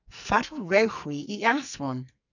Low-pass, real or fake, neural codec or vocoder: 7.2 kHz; fake; codec, 44.1 kHz, 2.6 kbps, SNAC